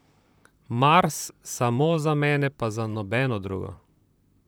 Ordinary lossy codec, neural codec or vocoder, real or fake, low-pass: none; vocoder, 44.1 kHz, 128 mel bands, Pupu-Vocoder; fake; none